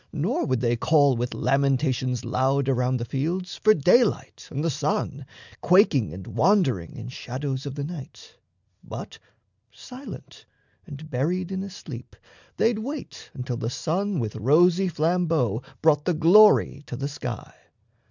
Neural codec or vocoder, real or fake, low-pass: none; real; 7.2 kHz